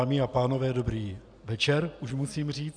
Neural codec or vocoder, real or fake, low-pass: none; real; 9.9 kHz